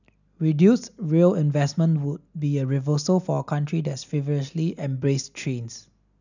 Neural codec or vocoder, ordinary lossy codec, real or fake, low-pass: none; none; real; 7.2 kHz